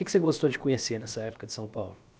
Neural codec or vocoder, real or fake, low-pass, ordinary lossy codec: codec, 16 kHz, about 1 kbps, DyCAST, with the encoder's durations; fake; none; none